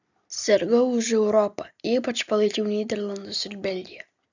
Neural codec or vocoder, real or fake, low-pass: none; real; 7.2 kHz